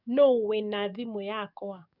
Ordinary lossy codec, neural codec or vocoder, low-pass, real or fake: none; codec, 44.1 kHz, 7.8 kbps, DAC; 5.4 kHz; fake